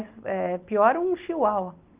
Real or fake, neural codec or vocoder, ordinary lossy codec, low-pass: real; none; Opus, 32 kbps; 3.6 kHz